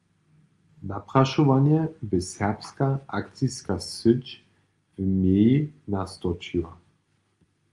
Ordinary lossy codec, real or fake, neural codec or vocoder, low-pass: Opus, 32 kbps; real; none; 10.8 kHz